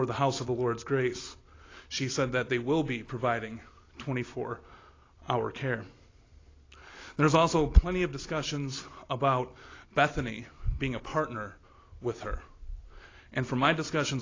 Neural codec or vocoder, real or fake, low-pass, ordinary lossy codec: none; real; 7.2 kHz; AAC, 32 kbps